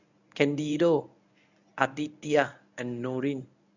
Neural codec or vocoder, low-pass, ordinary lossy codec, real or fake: codec, 24 kHz, 0.9 kbps, WavTokenizer, medium speech release version 1; 7.2 kHz; none; fake